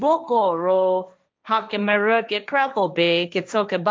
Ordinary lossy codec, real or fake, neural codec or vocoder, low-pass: none; fake; codec, 16 kHz, 1.1 kbps, Voila-Tokenizer; none